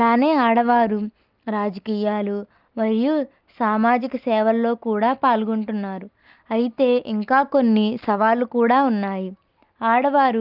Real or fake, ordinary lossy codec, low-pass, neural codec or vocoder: real; Opus, 32 kbps; 5.4 kHz; none